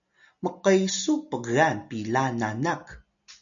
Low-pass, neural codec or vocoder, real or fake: 7.2 kHz; none; real